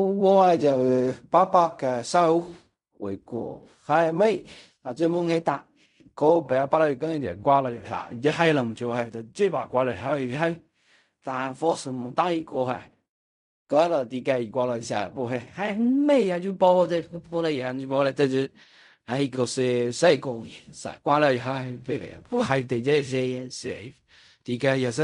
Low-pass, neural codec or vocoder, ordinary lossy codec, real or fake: 10.8 kHz; codec, 16 kHz in and 24 kHz out, 0.4 kbps, LongCat-Audio-Codec, fine tuned four codebook decoder; none; fake